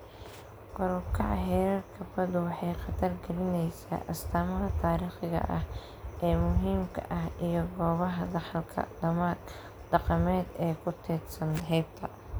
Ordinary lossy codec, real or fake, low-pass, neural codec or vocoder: none; real; none; none